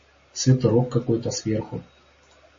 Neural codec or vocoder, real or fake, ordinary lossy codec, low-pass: none; real; MP3, 32 kbps; 7.2 kHz